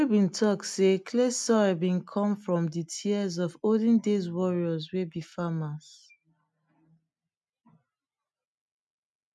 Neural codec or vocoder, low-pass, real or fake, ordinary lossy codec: none; none; real; none